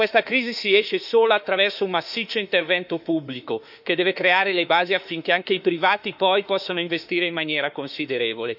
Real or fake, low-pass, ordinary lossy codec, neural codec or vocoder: fake; 5.4 kHz; none; autoencoder, 48 kHz, 32 numbers a frame, DAC-VAE, trained on Japanese speech